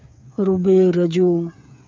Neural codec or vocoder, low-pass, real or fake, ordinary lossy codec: codec, 16 kHz, 8 kbps, FreqCodec, smaller model; none; fake; none